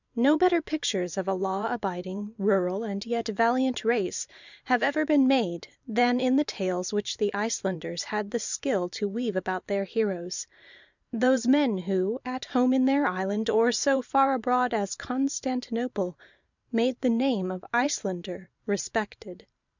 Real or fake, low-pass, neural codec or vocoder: fake; 7.2 kHz; vocoder, 44.1 kHz, 128 mel bands every 512 samples, BigVGAN v2